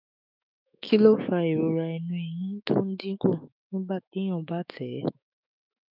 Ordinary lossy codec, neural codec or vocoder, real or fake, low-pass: none; autoencoder, 48 kHz, 128 numbers a frame, DAC-VAE, trained on Japanese speech; fake; 5.4 kHz